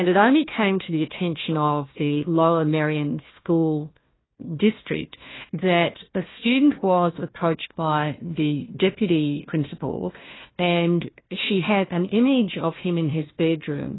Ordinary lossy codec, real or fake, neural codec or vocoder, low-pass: AAC, 16 kbps; fake; codec, 16 kHz, 1 kbps, FunCodec, trained on Chinese and English, 50 frames a second; 7.2 kHz